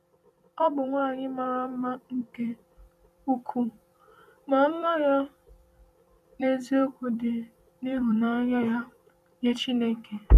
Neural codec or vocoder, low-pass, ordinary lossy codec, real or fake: vocoder, 44.1 kHz, 128 mel bands every 256 samples, BigVGAN v2; 14.4 kHz; none; fake